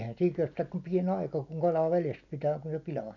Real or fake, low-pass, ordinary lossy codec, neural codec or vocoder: real; 7.2 kHz; none; none